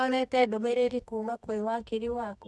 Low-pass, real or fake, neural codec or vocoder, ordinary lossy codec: none; fake; codec, 24 kHz, 0.9 kbps, WavTokenizer, medium music audio release; none